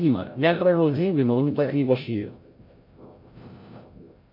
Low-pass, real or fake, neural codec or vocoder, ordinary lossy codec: 5.4 kHz; fake; codec, 16 kHz, 0.5 kbps, FreqCodec, larger model; MP3, 32 kbps